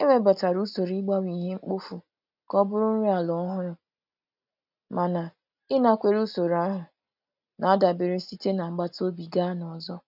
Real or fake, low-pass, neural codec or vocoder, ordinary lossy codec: real; 5.4 kHz; none; none